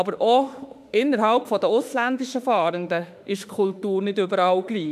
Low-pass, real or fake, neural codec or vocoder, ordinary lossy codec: 14.4 kHz; fake; autoencoder, 48 kHz, 32 numbers a frame, DAC-VAE, trained on Japanese speech; none